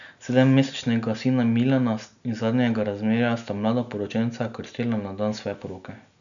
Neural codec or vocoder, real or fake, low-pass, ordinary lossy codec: none; real; 7.2 kHz; none